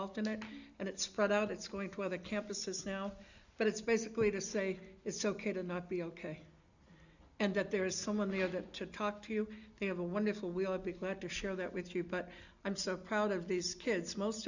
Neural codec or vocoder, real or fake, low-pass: none; real; 7.2 kHz